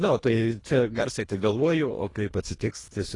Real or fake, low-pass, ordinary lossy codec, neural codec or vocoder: fake; 10.8 kHz; AAC, 32 kbps; codec, 24 kHz, 1.5 kbps, HILCodec